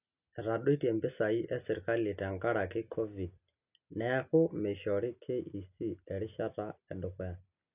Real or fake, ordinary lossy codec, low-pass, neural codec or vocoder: real; none; 3.6 kHz; none